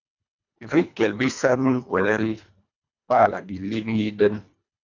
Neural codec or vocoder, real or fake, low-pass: codec, 24 kHz, 1.5 kbps, HILCodec; fake; 7.2 kHz